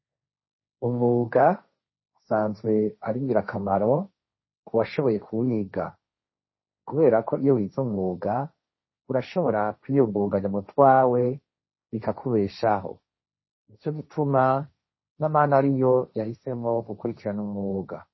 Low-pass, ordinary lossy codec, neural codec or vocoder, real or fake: 7.2 kHz; MP3, 24 kbps; codec, 16 kHz, 1.1 kbps, Voila-Tokenizer; fake